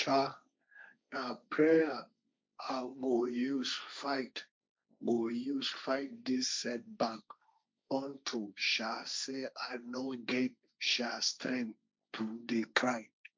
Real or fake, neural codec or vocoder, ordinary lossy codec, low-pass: fake; codec, 16 kHz, 1.1 kbps, Voila-Tokenizer; none; none